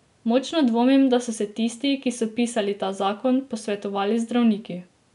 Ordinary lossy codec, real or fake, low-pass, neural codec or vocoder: none; real; 10.8 kHz; none